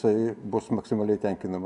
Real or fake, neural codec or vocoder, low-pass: real; none; 10.8 kHz